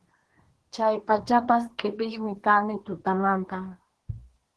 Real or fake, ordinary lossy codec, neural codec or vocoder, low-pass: fake; Opus, 16 kbps; codec, 24 kHz, 1 kbps, SNAC; 10.8 kHz